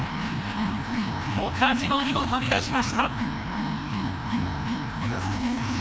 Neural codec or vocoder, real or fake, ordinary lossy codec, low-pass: codec, 16 kHz, 1 kbps, FreqCodec, larger model; fake; none; none